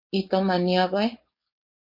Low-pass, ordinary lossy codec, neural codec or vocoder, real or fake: 5.4 kHz; MP3, 32 kbps; codec, 16 kHz, 4.8 kbps, FACodec; fake